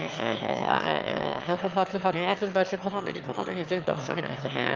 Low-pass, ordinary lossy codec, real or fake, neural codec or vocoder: 7.2 kHz; Opus, 24 kbps; fake; autoencoder, 22.05 kHz, a latent of 192 numbers a frame, VITS, trained on one speaker